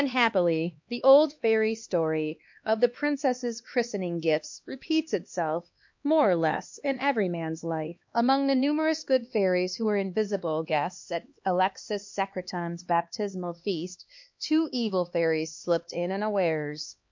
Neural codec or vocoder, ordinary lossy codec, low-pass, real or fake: codec, 16 kHz, 2 kbps, X-Codec, HuBERT features, trained on LibriSpeech; MP3, 48 kbps; 7.2 kHz; fake